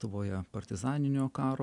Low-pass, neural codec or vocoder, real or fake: 10.8 kHz; none; real